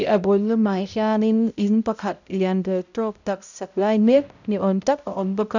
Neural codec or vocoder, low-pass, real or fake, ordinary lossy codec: codec, 16 kHz, 0.5 kbps, X-Codec, HuBERT features, trained on balanced general audio; 7.2 kHz; fake; none